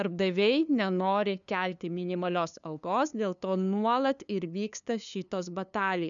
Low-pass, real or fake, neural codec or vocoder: 7.2 kHz; fake; codec, 16 kHz, 2 kbps, FunCodec, trained on LibriTTS, 25 frames a second